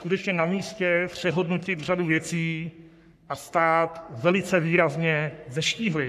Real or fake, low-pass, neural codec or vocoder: fake; 14.4 kHz; codec, 44.1 kHz, 3.4 kbps, Pupu-Codec